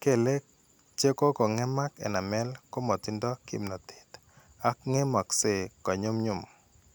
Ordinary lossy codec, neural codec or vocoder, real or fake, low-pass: none; none; real; none